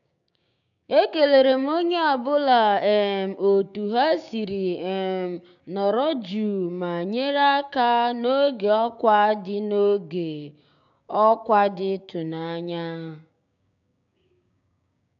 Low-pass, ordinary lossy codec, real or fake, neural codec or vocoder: 7.2 kHz; none; fake; codec, 16 kHz, 6 kbps, DAC